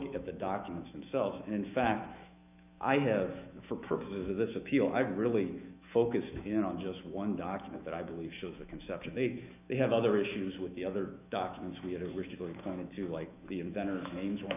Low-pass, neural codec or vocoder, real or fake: 3.6 kHz; autoencoder, 48 kHz, 128 numbers a frame, DAC-VAE, trained on Japanese speech; fake